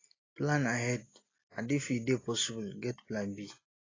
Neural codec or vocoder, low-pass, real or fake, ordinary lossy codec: none; 7.2 kHz; real; AAC, 32 kbps